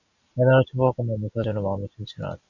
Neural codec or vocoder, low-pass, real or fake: none; 7.2 kHz; real